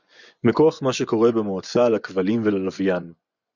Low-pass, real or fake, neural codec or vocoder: 7.2 kHz; fake; vocoder, 24 kHz, 100 mel bands, Vocos